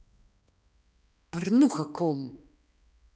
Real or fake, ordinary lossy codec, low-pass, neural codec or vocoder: fake; none; none; codec, 16 kHz, 2 kbps, X-Codec, HuBERT features, trained on balanced general audio